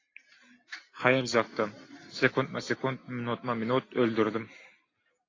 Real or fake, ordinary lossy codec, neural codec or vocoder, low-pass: real; AAC, 32 kbps; none; 7.2 kHz